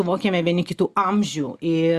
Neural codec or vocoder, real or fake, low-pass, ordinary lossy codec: none; real; 14.4 kHz; Opus, 64 kbps